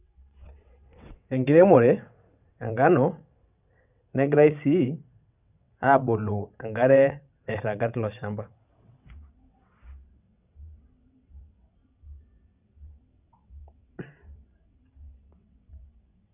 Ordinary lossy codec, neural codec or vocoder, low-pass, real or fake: none; vocoder, 22.05 kHz, 80 mel bands, WaveNeXt; 3.6 kHz; fake